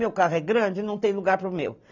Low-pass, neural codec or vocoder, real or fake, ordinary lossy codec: 7.2 kHz; none; real; none